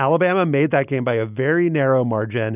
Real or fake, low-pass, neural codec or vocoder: real; 3.6 kHz; none